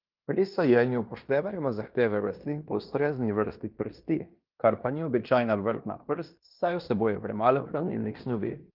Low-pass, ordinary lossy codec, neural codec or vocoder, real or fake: 5.4 kHz; Opus, 24 kbps; codec, 16 kHz in and 24 kHz out, 0.9 kbps, LongCat-Audio-Codec, fine tuned four codebook decoder; fake